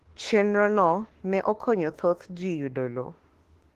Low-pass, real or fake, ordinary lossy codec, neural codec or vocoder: 14.4 kHz; fake; Opus, 16 kbps; autoencoder, 48 kHz, 32 numbers a frame, DAC-VAE, trained on Japanese speech